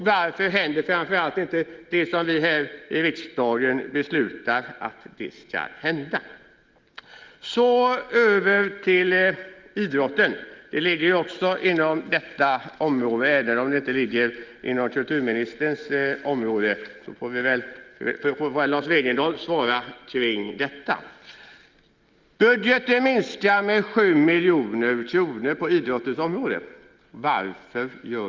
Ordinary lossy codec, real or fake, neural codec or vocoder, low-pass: Opus, 32 kbps; real; none; 7.2 kHz